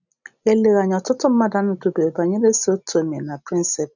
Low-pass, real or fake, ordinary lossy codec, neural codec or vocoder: 7.2 kHz; real; none; none